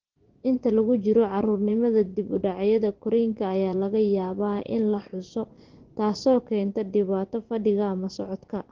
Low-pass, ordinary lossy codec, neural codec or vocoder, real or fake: 7.2 kHz; Opus, 16 kbps; vocoder, 24 kHz, 100 mel bands, Vocos; fake